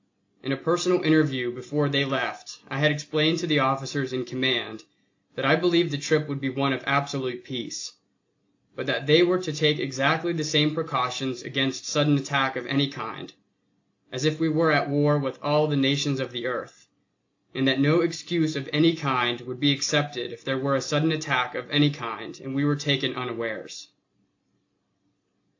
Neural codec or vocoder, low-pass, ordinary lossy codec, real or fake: none; 7.2 kHz; AAC, 48 kbps; real